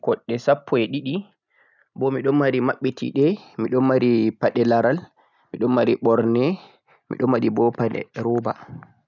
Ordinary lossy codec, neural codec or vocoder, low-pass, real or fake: none; none; 7.2 kHz; real